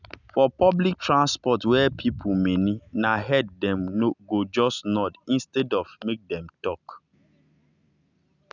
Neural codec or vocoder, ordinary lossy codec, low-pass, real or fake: none; none; 7.2 kHz; real